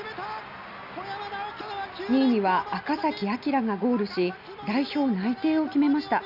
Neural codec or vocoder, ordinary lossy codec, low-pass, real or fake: none; none; 5.4 kHz; real